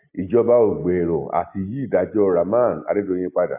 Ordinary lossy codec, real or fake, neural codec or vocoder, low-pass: AAC, 32 kbps; real; none; 3.6 kHz